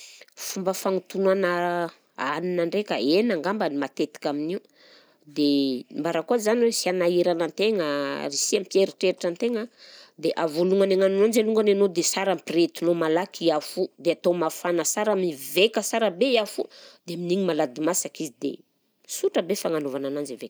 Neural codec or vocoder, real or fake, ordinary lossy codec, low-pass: none; real; none; none